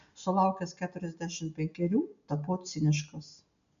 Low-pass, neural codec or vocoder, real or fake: 7.2 kHz; none; real